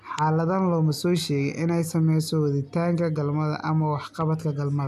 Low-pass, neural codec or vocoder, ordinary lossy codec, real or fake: 14.4 kHz; none; none; real